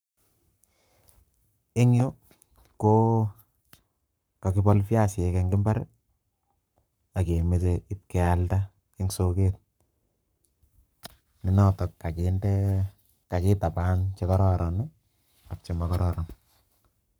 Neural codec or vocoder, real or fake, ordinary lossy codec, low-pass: codec, 44.1 kHz, 7.8 kbps, Pupu-Codec; fake; none; none